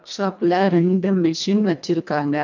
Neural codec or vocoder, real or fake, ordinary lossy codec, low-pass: codec, 24 kHz, 1.5 kbps, HILCodec; fake; none; 7.2 kHz